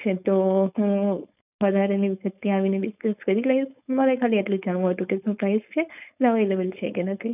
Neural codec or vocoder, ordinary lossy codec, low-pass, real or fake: codec, 16 kHz, 4.8 kbps, FACodec; none; 3.6 kHz; fake